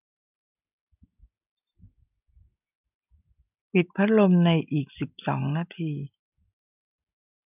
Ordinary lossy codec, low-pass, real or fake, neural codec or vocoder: none; 3.6 kHz; real; none